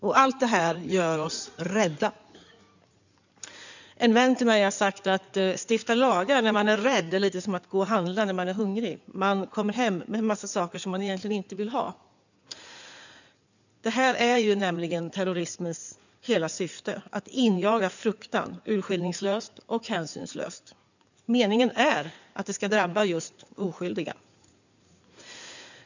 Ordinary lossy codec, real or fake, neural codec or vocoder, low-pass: none; fake; codec, 16 kHz in and 24 kHz out, 2.2 kbps, FireRedTTS-2 codec; 7.2 kHz